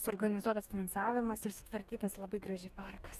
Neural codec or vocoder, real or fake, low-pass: codec, 44.1 kHz, 2.6 kbps, DAC; fake; 14.4 kHz